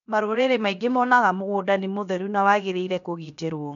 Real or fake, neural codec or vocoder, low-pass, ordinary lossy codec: fake; codec, 16 kHz, 0.7 kbps, FocalCodec; 7.2 kHz; none